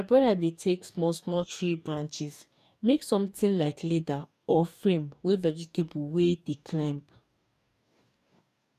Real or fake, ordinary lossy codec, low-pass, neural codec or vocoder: fake; none; 14.4 kHz; codec, 44.1 kHz, 2.6 kbps, DAC